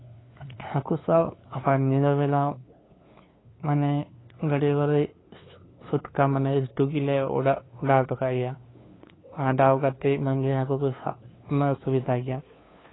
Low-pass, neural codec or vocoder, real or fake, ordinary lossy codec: 7.2 kHz; codec, 16 kHz, 2 kbps, FunCodec, trained on LibriTTS, 25 frames a second; fake; AAC, 16 kbps